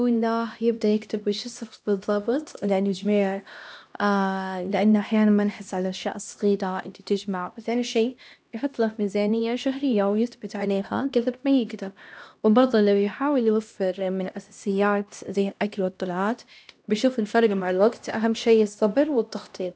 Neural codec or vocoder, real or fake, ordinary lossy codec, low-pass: codec, 16 kHz, 1 kbps, X-Codec, HuBERT features, trained on LibriSpeech; fake; none; none